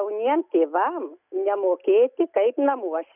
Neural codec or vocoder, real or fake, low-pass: none; real; 3.6 kHz